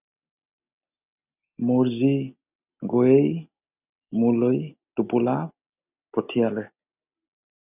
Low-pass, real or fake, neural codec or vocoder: 3.6 kHz; real; none